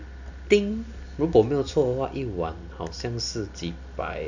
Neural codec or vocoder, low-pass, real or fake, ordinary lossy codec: none; 7.2 kHz; real; none